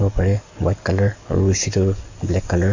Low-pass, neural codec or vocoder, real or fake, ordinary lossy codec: 7.2 kHz; codec, 44.1 kHz, 7.8 kbps, DAC; fake; AAC, 48 kbps